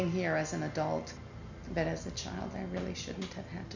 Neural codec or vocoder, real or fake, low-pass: none; real; 7.2 kHz